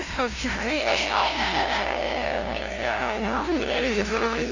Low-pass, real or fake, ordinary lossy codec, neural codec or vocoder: 7.2 kHz; fake; Opus, 64 kbps; codec, 16 kHz, 0.5 kbps, FunCodec, trained on LibriTTS, 25 frames a second